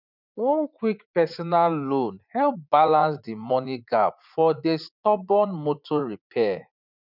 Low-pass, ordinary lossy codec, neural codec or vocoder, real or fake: 5.4 kHz; none; vocoder, 44.1 kHz, 80 mel bands, Vocos; fake